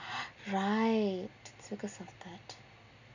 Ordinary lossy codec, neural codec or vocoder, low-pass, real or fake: none; none; 7.2 kHz; real